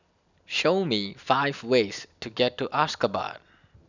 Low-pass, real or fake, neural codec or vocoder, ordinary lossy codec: 7.2 kHz; real; none; none